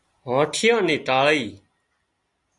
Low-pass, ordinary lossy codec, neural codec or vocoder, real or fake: 10.8 kHz; Opus, 64 kbps; none; real